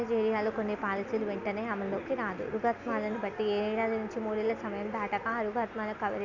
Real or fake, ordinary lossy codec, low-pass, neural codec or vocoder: real; none; 7.2 kHz; none